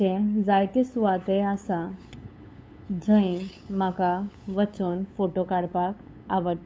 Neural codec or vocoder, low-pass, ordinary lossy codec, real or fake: codec, 16 kHz, 8 kbps, FunCodec, trained on LibriTTS, 25 frames a second; none; none; fake